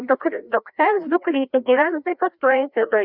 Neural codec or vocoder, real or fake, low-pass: codec, 16 kHz, 1 kbps, FreqCodec, larger model; fake; 5.4 kHz